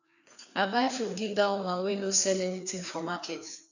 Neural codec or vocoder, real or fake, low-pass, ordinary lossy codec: codec, 16 kHz, 2 kbps, FreqCodec, larger model; fake; 7.2 kHz; none